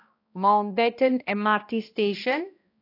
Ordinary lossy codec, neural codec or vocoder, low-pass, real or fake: AAC, 32 kbps; codec, 16 kHz, 1 kbps, X-Codec, HuBERT features, trained on balanced general audio; 5.4 kHz; fake